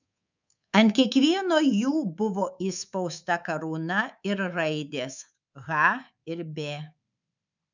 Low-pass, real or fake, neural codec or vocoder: 7.2 kHz; fake; codec, 24 kHz, 3.1 kbps, DualCodec